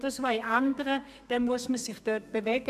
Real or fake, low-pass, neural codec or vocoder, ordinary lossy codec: fake; 14.4 kHz; codec, 32 kHz, 1.9 kbps, SNAC; none